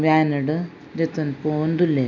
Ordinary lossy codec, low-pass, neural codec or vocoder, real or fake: none; 7.2 kHz; none; real